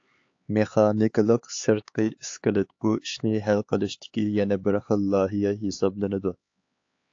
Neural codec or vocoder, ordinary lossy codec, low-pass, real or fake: codec, 16 kHz, 4 kbps, X-Codec, HuBERT features, trained on LibriSpeech; AAC, 48 kbps; 7.2 kHz; fake